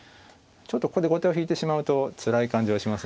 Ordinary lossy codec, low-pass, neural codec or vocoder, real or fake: none; none; none; real